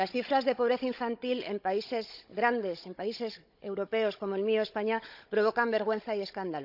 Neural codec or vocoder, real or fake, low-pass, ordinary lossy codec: codec, 16 kHz, 16 kbps, FunCodec, trained on Chinese and English, 50 frames a second; fake; 5.4 kHz; none